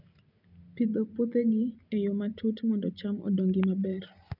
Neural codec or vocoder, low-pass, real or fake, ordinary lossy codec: none; 5.4 kHz; real; none